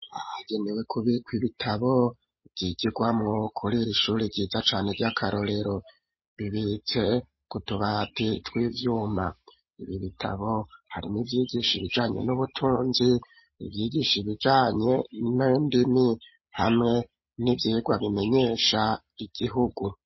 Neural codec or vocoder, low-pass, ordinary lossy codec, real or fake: none; 7.2 kHz; MP3, 24 kbps; real